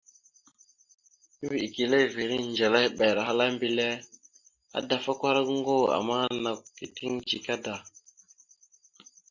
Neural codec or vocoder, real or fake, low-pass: none; real; 7.2 kHz